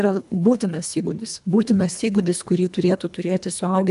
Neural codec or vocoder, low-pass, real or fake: codec, 24 kHz, 1.5 kbps, HILCodec; 10.8 kHz; fake